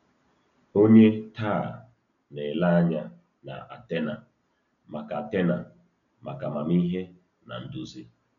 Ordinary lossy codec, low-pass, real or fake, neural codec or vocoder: none; 7.2 kHz; real; none